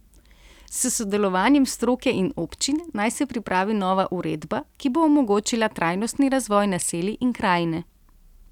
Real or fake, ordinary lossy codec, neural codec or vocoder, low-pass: real; none; none; 19.8 kHz